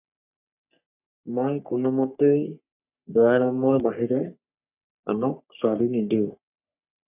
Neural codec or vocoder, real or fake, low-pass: codec, 44.1 kHz, 3.4 kbps, Pupu-Codec; fake; 3.6 kHz